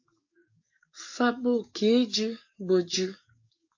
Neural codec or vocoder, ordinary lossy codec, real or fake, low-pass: codec, 44.1 kHz, 7.8 kbps, DAC; AAC, 48 kbps; fake; 7.2 kHz